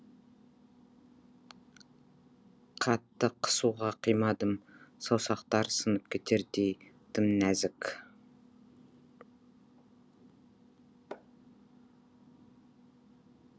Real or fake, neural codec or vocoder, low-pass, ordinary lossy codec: real; none; none; none